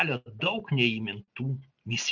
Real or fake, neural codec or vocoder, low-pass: real; none; 7.2 kHz